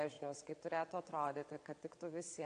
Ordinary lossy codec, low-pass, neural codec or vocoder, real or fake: AAC, 48 kbps; 9.9 kHz; vocoder, 22.05 kHz, 80 mel bands, WaveNeXt; fake